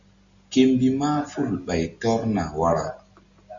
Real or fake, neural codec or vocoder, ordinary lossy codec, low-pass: real; none; Opus, 64 kbps; 7.2 kHz